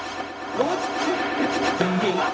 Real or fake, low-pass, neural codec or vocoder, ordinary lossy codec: fake; none; codec, 16 kHz, 0.4 kbps, LongCat-Audio-Codec; none